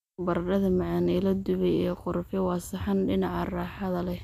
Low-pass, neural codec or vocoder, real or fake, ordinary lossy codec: 14.4 kHz; none; real; none